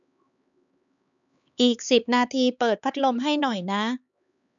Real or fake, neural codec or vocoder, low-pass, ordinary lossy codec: fake; codec, 16 kHz, 4 kbps, X-Codec, HuBERT features, trained on LibriSpeech; 7.2 kHz; none